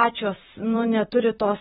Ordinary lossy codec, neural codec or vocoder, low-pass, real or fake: AAC, 16 kbps; vocoder, 44.1 kHz, 128 mel bands, Pupu-Vocoder; 19.8 kHz; fake